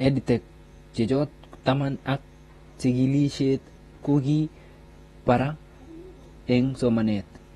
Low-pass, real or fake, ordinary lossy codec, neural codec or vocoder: 19.8 kHz; fake; AAC, 32 kbps; vocoder, 48 kHz, 128 mel bands, Vocos